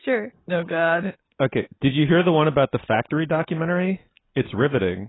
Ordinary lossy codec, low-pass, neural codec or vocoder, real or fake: AAC, 16 kbps; 7.2 kHz; none; real